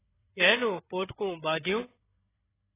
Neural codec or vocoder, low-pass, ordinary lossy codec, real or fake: codec, 16 kHz, 8 kbps, FreqCodec, larger model; 3.6 kHz; AAC, 16 kbps; fake